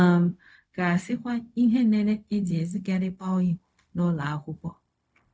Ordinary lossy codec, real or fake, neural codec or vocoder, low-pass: none; fake; codec, 16 kHz, 0.4 kbps, LongCat-Audio-Codec; none